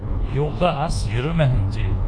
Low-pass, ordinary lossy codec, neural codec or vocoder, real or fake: 9.9 kHz; AAC, 64 kbps; codec, 24 kHz, 1.2 kbps, DualCodec; fake